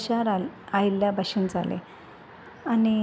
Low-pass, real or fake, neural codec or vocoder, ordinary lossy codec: none; real; none; none